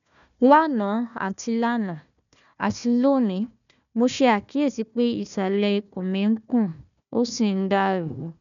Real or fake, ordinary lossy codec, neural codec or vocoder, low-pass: fake; none; codec, 16 kHz, 1 kbps, FunCodec, trained on Chinese and English, 50 frames a second; 7.2 kHz